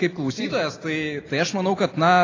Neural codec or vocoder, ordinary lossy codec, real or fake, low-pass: none; AAC, 32 kbps; real; 7.2 kHz